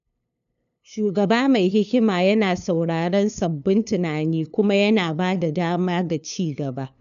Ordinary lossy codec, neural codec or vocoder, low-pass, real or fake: none; codec, 16 kHz, 2 kbps, FunCodec, trained on LibriTTS, 25 frames a second; 7.2 kHz; fake